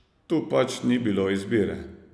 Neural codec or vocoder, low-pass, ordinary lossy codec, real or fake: none; none; none; real